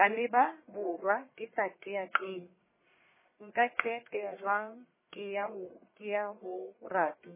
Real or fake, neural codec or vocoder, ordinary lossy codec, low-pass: fake; codec, 44.1 kHz, 1.7 kbps, Pupu-Codec; MP3, 16 kbps; 3.6 kHz